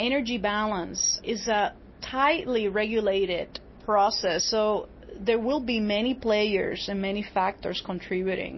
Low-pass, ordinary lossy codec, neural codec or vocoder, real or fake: 7.2 kHz; MP3, 24 kbps; none; real